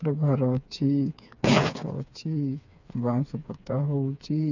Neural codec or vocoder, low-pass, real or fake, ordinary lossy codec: codec, 16 kHz, 4 kbps, FreqCodec, smaller model; 7.2 kHz; fake; none